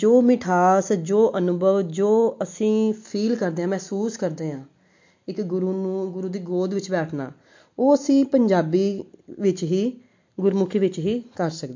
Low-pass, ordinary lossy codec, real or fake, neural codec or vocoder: 7.2 kHz; MP3, 48 kbps; real; none